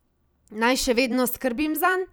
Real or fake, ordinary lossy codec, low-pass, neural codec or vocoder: fake; none; none; vocoder, 44.1 kHz, 128 mel bands every 256 samples, BigVGAN v2